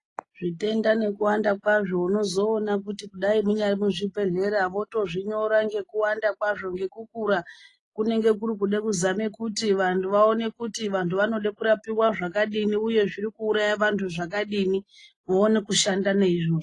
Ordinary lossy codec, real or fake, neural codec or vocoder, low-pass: AAC, 32 kbps; real; none; 10.8 kHz